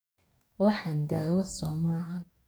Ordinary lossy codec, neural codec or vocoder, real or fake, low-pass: none; codec, 44.1 kHz, 2.6 kbps, DAC; fake; none